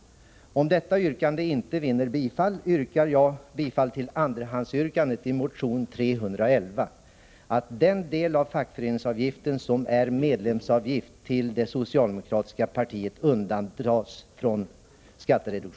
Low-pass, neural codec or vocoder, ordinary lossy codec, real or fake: none; none; none; real